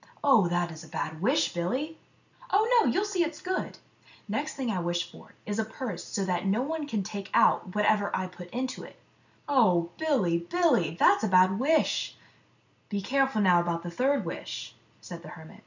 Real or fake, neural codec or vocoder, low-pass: real; none; 7.2 kHz